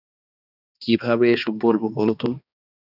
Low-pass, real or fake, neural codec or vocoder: 5.4 kHz; fake; codec, 16 kHz, 2 kbps, X-Codec, HuBERT features, trained on balanced general audio